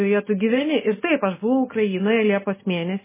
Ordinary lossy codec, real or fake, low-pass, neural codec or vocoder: MP3, 16 kbps; real; 3.6 kHz; none